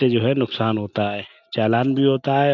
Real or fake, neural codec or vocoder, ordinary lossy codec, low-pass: real; none; none; 7.2 kHz